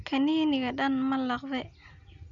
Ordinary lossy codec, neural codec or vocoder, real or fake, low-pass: none; none; real; 7.2 kHz